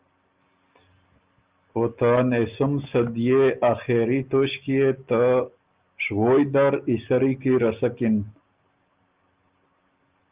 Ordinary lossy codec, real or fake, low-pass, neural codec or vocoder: Opus, 32 kbps; real; 3.6 kHz; none